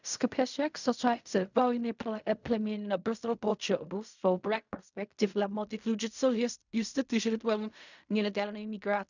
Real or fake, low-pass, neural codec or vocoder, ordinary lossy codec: fake; 7.2 kHz; codec, 16 kHz in and 24 kHz out, 0.4 kbps, LongCat-Audio-Codec, fine tuned four codebook decoder; none